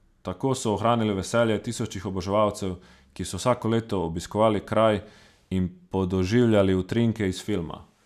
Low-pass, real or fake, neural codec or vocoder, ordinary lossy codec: 14.4 kHz; real; none; none